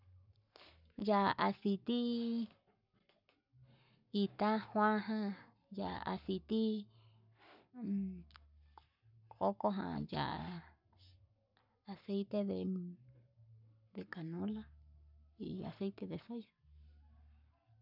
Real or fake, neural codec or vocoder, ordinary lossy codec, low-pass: fake; codec, 44.1 kHz, 7.8 kbps, Pupu-Codec; none; 5.4 kHz